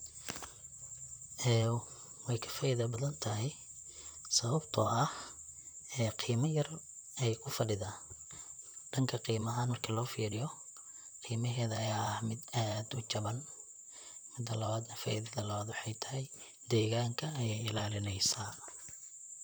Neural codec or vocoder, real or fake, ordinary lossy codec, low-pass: vocoder, 44.1 kHz, 128 mel bands, Pupu-Vocoder; fake; none; none